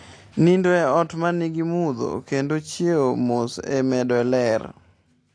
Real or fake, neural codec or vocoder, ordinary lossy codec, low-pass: real; none; AAC, 48 kbps; 9.9 kHz